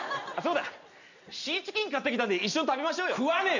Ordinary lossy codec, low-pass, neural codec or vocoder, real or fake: none; 7.2 kHz; none; real